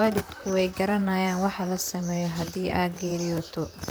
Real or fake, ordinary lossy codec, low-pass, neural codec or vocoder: fake; none; none; vocoder, 44.1 kHz, 128 mel bands, Pupu-Vocoder